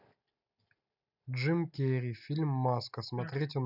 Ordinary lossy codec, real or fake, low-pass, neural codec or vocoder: none; real; 5.4 kHz; none